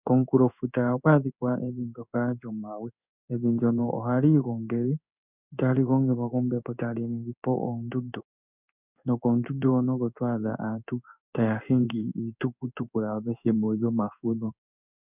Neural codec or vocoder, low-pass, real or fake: codec, 16 kHz in and 24 kHz out, 1 kbps, XY-Tokenizer; 3.6 kHz; fake